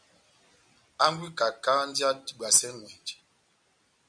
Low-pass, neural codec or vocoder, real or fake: 9.9 kHz; none; real